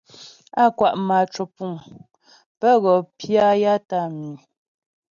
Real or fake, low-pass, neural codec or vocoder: real; 7.2 kHz; none